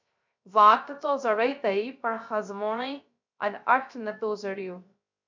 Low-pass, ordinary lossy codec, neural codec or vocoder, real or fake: 7.2 kHz; MP3, 64 kbps; codec, 16 kHz, 0.3 kbps, FocalCodec; fake